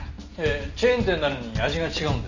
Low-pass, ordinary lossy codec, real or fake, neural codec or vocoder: 7.2 kHz; none; real; none